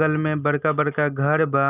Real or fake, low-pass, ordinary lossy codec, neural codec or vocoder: real; 3.6 kHz; none; none